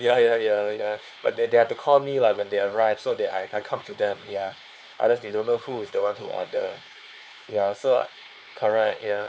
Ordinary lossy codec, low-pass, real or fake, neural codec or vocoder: none; none; fake; codec, 16 kHz, 4 kbps, X-Codec, HuBERT features, trained on LibriSpeech